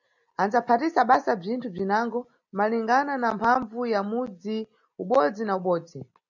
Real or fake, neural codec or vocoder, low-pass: real; none; 7.2 kHz